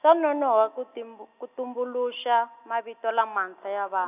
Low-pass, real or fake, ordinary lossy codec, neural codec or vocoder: 3.6 kHz; real; none; none